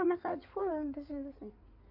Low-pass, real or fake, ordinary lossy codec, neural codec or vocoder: 5.4 kHz; fake; none; codec, 16 kHz in and 24 kHz out, 2.2 kbps, FireRedTTS-2 codec